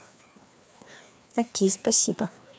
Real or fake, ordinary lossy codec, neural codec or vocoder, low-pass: fake; none; codec, 16 kHz, 2 kbps, FreqCodec, larger model; none